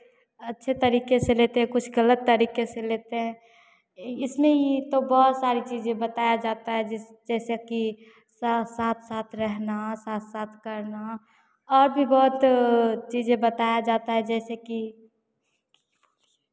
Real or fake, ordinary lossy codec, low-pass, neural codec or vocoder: real; none; none; none